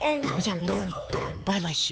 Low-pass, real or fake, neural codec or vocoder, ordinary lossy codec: none; fake; codec, 16 kHz, 4 kbps, X-Codec, HuBERT features, trained on LibriSpeech; none